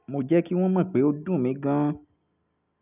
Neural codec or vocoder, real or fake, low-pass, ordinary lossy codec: none; real; 3.6 kHz; none